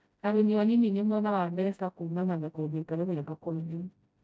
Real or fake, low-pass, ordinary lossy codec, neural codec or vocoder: fake; none; none; codec, 16 kHz, 0.5 kbps, FreqCodec, smaller model